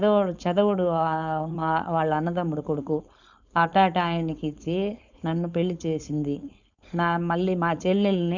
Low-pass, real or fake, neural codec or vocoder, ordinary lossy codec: 7.2 kHz; fake; codec, 16 kHz, 4.8 kbps, FACodec; none